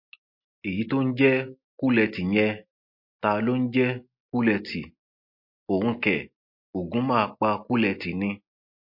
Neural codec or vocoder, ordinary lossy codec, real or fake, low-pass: none; MP3, 32 kbps; real; 5.4 kHz